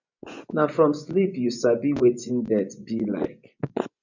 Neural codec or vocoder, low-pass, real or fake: vocoder, 24 kHz, 100 mel bands, Vocos; 7.2 kHz; fake